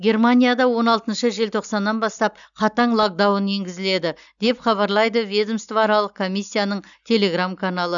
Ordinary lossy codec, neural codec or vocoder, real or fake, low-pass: none; none; real; 7.2 kHz